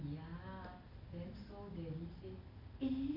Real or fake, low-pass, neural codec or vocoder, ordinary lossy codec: real; 5.4 kHz; none; none